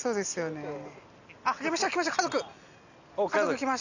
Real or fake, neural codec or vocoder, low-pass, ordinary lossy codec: real; none; 7.2 kHz; none